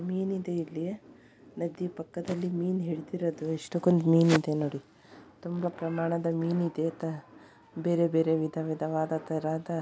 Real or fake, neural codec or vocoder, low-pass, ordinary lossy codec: real; none; none; none